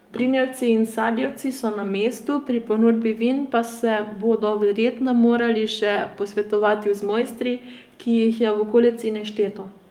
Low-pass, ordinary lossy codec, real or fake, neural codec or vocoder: 19.8 kHz; Opus, 32 kbps; fake; codec, 44.1 kHz, 7.8 kbps, DAC